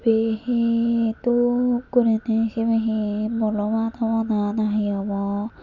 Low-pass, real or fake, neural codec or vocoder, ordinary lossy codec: 7.2 kHz; real; none; none